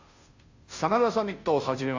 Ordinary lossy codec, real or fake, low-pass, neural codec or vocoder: AAC, 48 kbps; fake; 7.2 kHz; codec, 16 kHz, 0.5 kbps, FunCodec, trained on Chinese and English, 25 frames a second